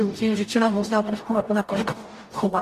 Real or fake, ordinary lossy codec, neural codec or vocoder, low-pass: fake; AAC, 48 kbps; codec, 44.1 kHz, 0.9 kbps, DAC; 14.4 kHz